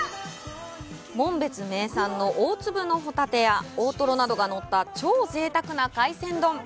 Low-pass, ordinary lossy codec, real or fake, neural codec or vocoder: none; none; real; none